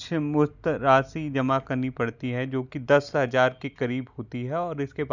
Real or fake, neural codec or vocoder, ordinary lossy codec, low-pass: real; none; none; 7.2 kHz